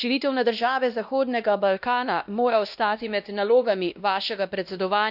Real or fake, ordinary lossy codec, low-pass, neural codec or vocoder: fake; none; 5.4 kHz; codec, 16 kHz, 1 kbps, X-Codec, WavLM features, trained on Multilingual LibriSpeech